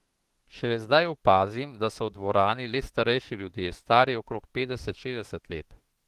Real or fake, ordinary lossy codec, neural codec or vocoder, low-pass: fake; Opus, 16 kbps; autoencoder, 48 kHz, 32 numbers a frame, DAC-VAE, trained on Japanese speech; 14.4 kHz